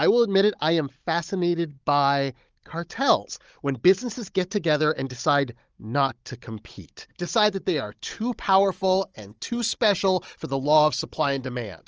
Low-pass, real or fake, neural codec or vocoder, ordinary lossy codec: 7.2 kHz; fake; codec, 16 kHz, 16 kbps, FunCodec, trained on Chinese and English, 50 frames a second; Opus, 24 kbps